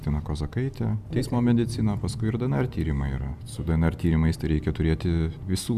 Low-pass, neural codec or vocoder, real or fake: 14.4 kHz; none; real